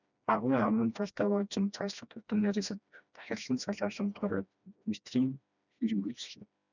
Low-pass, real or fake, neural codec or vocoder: 7.2 kHz; fake; codec, 16 kHz, 1 kbps, FreqCodec, smaller model